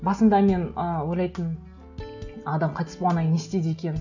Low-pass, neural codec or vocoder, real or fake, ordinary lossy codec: 7.2 kHz; none; real; none